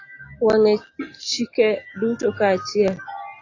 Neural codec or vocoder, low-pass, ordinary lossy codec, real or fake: none; 7.2 kHz; AAC, 32 kbps; real